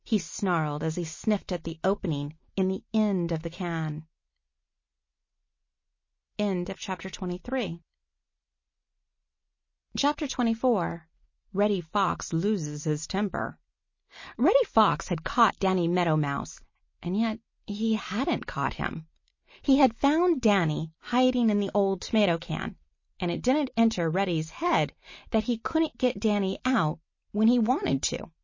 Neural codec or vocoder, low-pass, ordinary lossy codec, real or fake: none; 7.2 kHz; MP3, 32 kbps; real